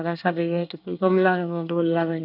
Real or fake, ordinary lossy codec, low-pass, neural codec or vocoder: fake; none; 5.4 kHz; codec, 24 kHz, 1 kbps, SNAC